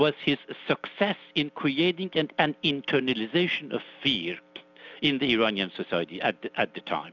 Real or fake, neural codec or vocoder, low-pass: real; none; 7.2 kHz